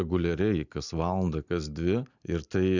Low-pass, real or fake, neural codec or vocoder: 7.2 kHz; real; none